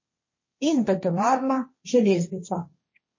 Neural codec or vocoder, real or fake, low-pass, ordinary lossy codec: codec, 16 kHz, 1.1 kbps, Voila-Tokenizer; fake; 7.2 kHz; MP3, 32 kbps